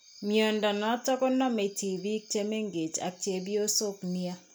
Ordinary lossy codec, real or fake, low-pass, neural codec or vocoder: none; real; none; none